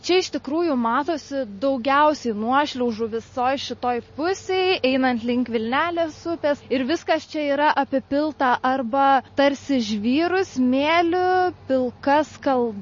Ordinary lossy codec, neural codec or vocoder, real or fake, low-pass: MP3, 32 kbps; none; real; 7.2 kHz